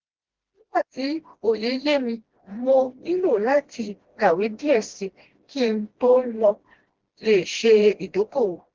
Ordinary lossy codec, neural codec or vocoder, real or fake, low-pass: Opus, 16 kbps; codec, 16 kHz, 1 kbps, FreqCodec, smaller model; fake; 7.2 kHz